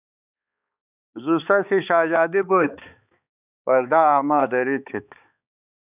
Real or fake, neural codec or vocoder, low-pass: fake; codec, 16 kHz, 4 kbps, X-Codec, HuBERT features, trained on balanced general audio; 3.6 kHz